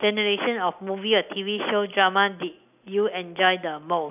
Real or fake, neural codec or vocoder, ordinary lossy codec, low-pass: real; none; none; 3.6 kHz